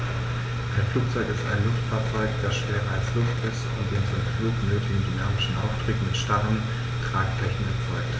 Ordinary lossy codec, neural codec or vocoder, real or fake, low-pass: none; none; real; none